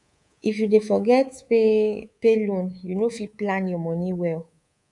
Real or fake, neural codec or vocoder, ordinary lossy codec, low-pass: fake; codec, 24 kHz, 3.1 kbps, DualCodec; none; 10.8 kHz